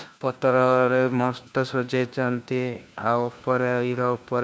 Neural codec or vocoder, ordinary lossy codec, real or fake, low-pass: codec, 16 kHz, 1 kbps, FunCodec, trained on LibriTTS, 50 frames a second; none; fake; none